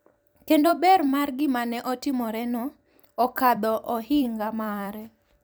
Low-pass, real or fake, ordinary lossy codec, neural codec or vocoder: none; fake; none; vocoder, 44.1 kHz, 128 mel bands every 256 samples, BigVGAN v2